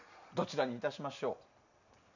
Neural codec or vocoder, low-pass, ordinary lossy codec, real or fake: none; 7.2 kHz; none; real